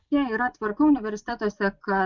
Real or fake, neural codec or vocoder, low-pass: fake; vocoder, 24 kHz, 100 mel bands, Vocos; 7.2 kHz